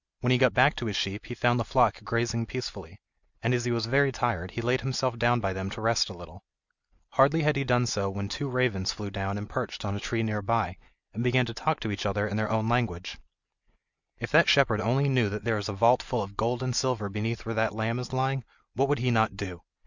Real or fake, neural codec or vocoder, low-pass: real; none; 7.2 kHz